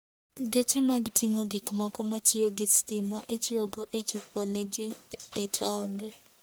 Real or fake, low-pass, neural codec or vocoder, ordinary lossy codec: fake; none; codec, 44.1 kHz, 1.7 kbps, Pupu-Codec; none